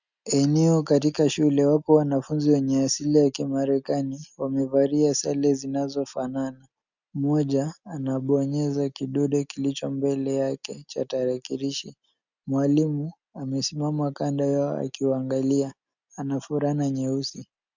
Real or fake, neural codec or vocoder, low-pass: real; none; 7.2 kHz